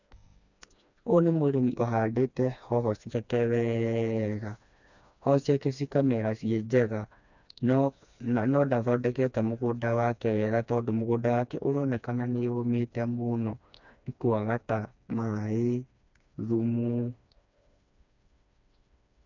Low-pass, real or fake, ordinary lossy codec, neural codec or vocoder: 7.2 kHz; fake; none; codec, 16 kHz, 2 kbps, FreqCodec, smaller model